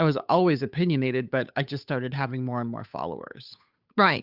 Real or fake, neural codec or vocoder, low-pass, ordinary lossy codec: fake; codec, 16 kHz, 8 kbps, FunCodec, trained on Chinese and English, 25 frames a second; 5.4 kHz; Opus, 64 kbps